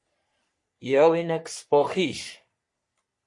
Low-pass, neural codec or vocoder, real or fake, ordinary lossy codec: 10.8 kHz; codec, 44.1 kHz, 3.4 kbps, Pupu-Codec; fake; MP3, 48 kbps